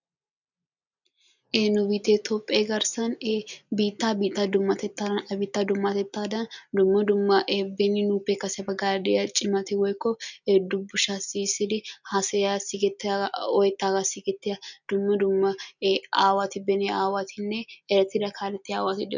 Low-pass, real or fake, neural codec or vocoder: 7.2 kHz; real; none